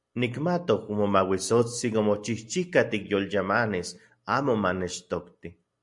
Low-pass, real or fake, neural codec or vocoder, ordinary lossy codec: 10.8 kHz; real; none; MP3, 64 kbps